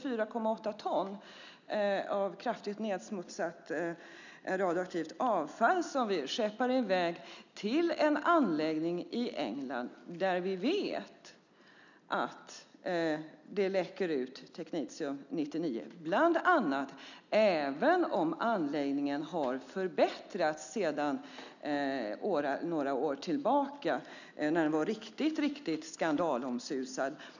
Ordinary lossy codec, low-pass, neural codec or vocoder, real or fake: none; 7.2 kHz; none; real